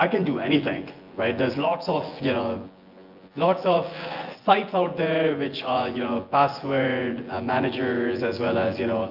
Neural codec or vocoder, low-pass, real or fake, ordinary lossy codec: vocoder, 24 kHz, 100 mel bands, Vocos; 5.4 kHz; fake; Opus, 24 kbps